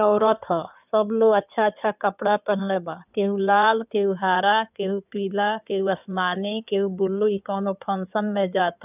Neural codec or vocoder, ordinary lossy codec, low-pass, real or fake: codec, 16 kHz in and 24 kHz out, 2.2 kbps, FireRedTTS-2 codec; none; 3.6 kHz; fake